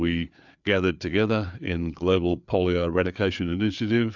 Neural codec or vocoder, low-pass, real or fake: codec, 16 kHz, 4 kbps, FreqCodec, larger model; 7.2 kHz; fake